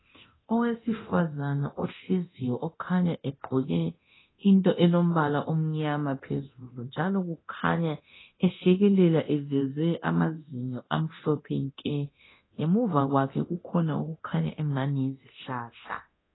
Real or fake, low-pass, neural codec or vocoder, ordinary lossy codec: fake; 7.2 kHz; codec, 24 kHz, 0.9 kbps, DualCodec; AAC, 16 kbps